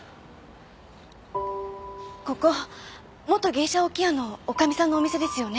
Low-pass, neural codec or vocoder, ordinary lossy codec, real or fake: none; none; none; real